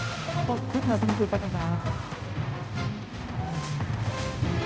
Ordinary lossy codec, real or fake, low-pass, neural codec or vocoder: none; fake; none; codec, 16 kHz, 0.5 kbps, X-Codec, HuBERT features, trained on balanced general audio